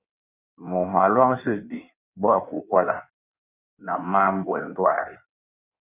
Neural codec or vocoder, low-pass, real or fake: codec, 16 kHz in and 24 kHz out, 1.1 kbps, FireRedTTS-2 codec; 3.6 kHz; fake